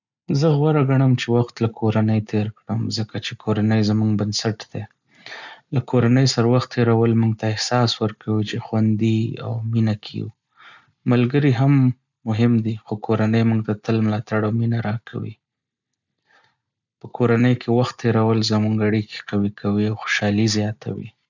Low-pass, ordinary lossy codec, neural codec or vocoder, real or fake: 7.2 kHz; none; none; real